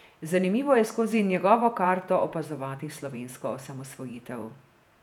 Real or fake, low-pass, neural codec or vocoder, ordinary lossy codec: fake; 19.8 kHz; vocoder, 48 kHz, 128 mel bands, Vocos; none